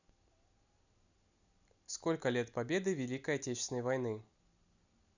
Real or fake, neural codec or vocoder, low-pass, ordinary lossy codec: real; none; 7.2 kHz; none